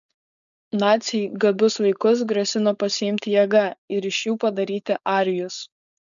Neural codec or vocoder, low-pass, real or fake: codec, 16 kHz, 4.8 kbps, FACodec; 7.2 kHz; fake